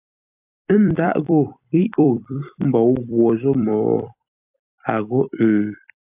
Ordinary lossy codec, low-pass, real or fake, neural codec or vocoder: AAC, 32 kbps; 3.6 kHz; fake; vocoder, 44.1 kHz, 128 mel bands every 256 samples, BigVGAN v2